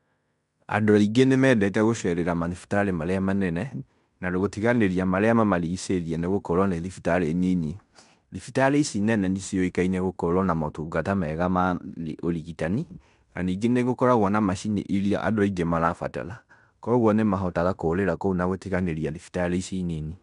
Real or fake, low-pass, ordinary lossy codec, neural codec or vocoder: fake; 10.8 kHz; none; codec, 16 kHz in and 24 kHz out, 0.9 kbps, LongCat-Audio-Codec, fine tuned four codebook decoder